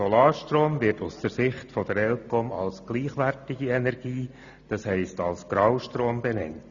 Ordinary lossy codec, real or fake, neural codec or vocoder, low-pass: none; real; none; 7.2 kHz